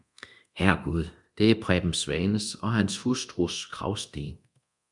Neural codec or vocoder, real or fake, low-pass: codec, 24 kHz, 0.9 kbps, DualCodec; fake; 10.8 kHz